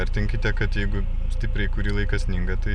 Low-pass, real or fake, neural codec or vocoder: 9.9 kHz; real; none